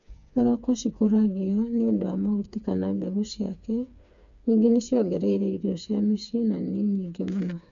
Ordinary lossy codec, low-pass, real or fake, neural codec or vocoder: none; 7.2 kHz; fake; codec, 16 kHz, 4 kbps, FreqCodec, smaller model